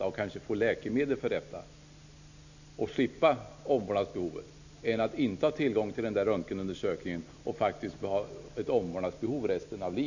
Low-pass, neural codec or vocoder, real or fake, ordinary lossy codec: 7.2 kHz; none; real; none